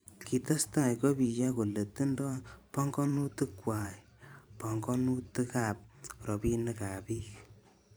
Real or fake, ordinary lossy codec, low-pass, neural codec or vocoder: fake; none; none; vocoder, 44.1 kHz, 128 mel bands every 512 samples, BigVGAN v2